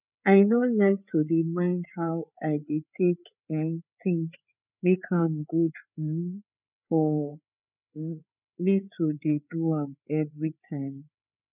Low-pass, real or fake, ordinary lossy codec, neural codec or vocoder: 3.6 kHz; fake; none; codec, 16 kHz, 4 kbps, FreqCodec, larger model